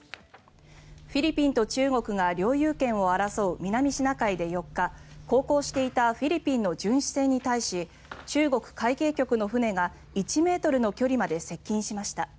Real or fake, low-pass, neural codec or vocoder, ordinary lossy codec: real; none; none; none